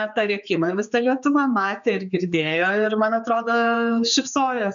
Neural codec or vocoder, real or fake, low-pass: codec, 16 kHz, 4 kbps, X-Codec, HuBERT features, trained on general audio; fake; 7.2 kHz